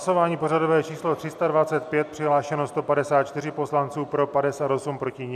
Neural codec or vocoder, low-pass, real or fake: none; 14.4 kHz; real